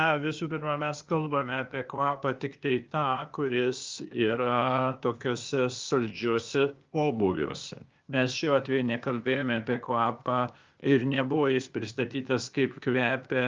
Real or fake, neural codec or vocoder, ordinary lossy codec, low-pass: fake; codec, 16 kHz, 0.8 kbps, ZipCodec; Opus, 24 kbps; 7.2 kHz